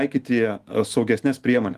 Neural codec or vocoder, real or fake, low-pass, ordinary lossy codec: autoencoder, 48 kHz, 128 numbers a frame, DAC-VAE, trained on Japanese speech; fake; 14.4 kHz; Opus, 24 kbps